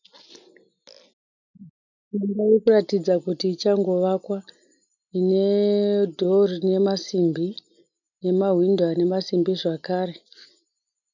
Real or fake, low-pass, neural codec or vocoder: real; 7.2 kHz; none